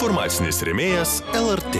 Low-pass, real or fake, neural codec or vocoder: 14.4 kHz; real; none